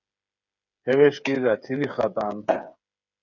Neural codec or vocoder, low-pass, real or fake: codec, 16 kHz, 8 kbps, FreqCodec, smaller model; 7.2 kHz; fake